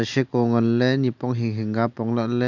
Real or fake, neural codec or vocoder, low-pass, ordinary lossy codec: real; none; 7.2 kHz; none